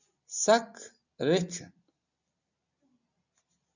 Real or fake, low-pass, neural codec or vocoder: real; 7.2 kHz; none